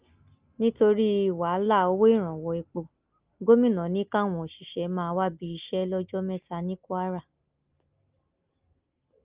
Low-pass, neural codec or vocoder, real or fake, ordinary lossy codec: 3.6 kHz; none; real; Opus, 24 kbps